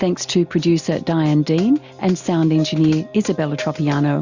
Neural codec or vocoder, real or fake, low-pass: none; real; 7.2 kHz